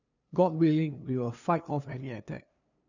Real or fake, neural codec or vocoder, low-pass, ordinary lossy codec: fake; codec, 16 kHz, 2 kbps, FunCodec, trained on LibriTTS, 25 frames a second; 7.2 kHz; none